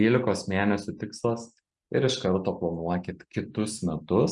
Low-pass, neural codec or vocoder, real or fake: 10.8 kHz; none; real